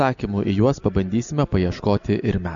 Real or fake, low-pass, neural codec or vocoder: real; 7.2 kHz; none